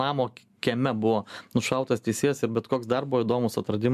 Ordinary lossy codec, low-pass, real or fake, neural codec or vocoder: MP3, 96 kbps; 14.4 kHz; real; none